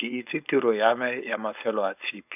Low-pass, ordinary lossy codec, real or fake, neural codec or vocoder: 3.6 kHz; none; fake; codec, 16 kHz, 4.8 kbps, FACodec